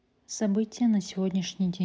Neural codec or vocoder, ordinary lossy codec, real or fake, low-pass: none; none; real; none